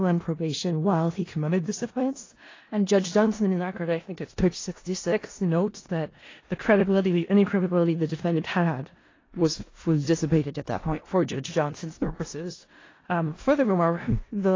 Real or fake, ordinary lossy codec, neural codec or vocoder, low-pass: fake; AAC, 32 kbps; codec, 16 kHz in and 24 kHz out, 0.4 kbps, LongCat-Audio-Codec, four codebook decoder; 7.2 kHz